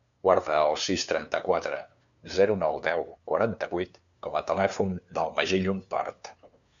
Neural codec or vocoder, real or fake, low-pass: codec, 16 kHz, 2 kbps, FunCodec, trained on LibriTTS, 25 frames a second; fake; 7.2 kHz